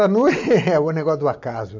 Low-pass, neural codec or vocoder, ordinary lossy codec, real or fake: 7.2 kHz; none; MP3, 48 kbps; real